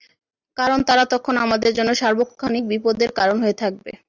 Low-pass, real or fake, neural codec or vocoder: 7.2 kHz; real; none